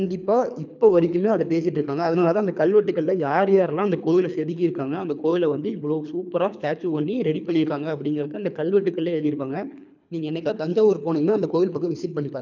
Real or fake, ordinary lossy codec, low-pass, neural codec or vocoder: fake; none; 7.2 kHz; codec, 24 kHz, 3 kbps, HILCodec